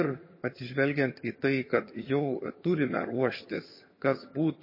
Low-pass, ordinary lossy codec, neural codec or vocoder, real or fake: 5.4 kHz; MP3, 24 kbps; vocoder, 22.05 kHz, 80 mel bands, HiFi-GAN; fake